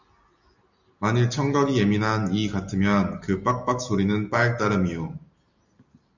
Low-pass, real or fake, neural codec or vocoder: 7.2 kHz; real; none